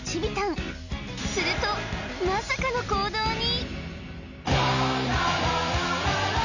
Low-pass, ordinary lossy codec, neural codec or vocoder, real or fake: 7.2 kHz; none; none; real